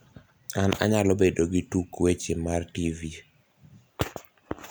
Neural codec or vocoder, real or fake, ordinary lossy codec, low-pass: none; real; none; none